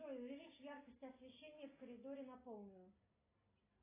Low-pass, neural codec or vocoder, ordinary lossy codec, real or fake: 3.6 kHz; none; AAC, 24 kbps; real